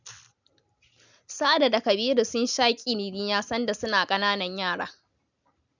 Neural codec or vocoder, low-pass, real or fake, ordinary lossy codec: none; 7.2 kHz; real; none